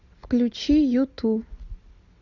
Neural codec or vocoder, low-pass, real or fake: none; 7.2 kHz; real